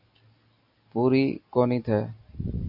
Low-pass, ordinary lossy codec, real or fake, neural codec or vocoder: 5.4 kHz; AAC, 48 kbps; real; none